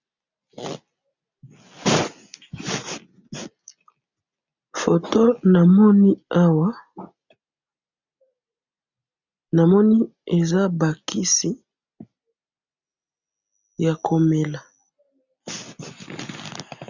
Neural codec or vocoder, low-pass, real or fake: none; 7.2 kHz; real